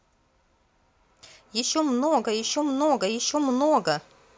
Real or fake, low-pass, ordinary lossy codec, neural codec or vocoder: real; none; none; none